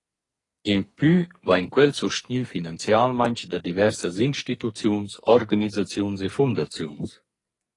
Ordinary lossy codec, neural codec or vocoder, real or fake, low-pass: AAC, 32 kbps; codec, 44.1 kHz, 2.6 kbps, SNAC; fake; 10.8 kHz